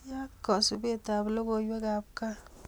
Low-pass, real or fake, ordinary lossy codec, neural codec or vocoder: none; real; none; none